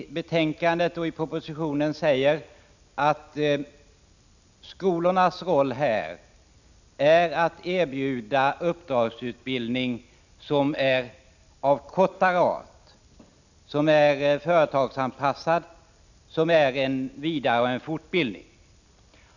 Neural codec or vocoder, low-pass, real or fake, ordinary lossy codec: none; 7.2 kHz; real; none